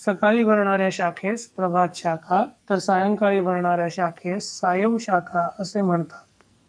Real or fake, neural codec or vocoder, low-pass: fake; codec, 44.1 kHz, 2.6 kbps, SNAC; 9.9 kHz